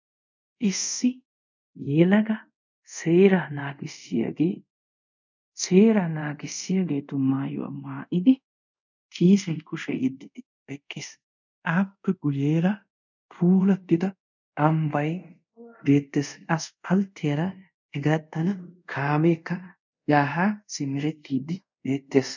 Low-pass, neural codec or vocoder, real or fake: 7.2 kHz; codec, 24 kHz, 0.5 kbps, DualCodec; fake